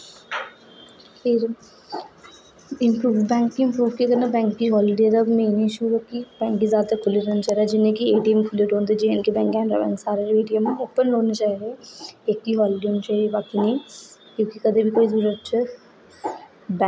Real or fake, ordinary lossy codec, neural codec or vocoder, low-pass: real; none; none; none